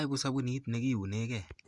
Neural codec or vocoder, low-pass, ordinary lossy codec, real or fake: none; 10.8 kHz; Opus, 64 kbps; real